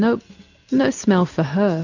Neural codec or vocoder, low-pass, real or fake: none; 7.2 kHz; real